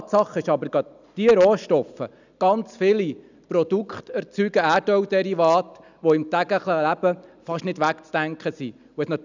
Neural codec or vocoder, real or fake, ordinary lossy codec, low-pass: none; real; none; 7.2 kHz